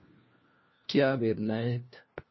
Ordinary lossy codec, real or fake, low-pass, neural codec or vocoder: MP3, 24 kbps; fake; 7.2 kHz; codec, 16 kHz, 1 kbps, FunCodec, trained on LibriTTS, 50 frames a second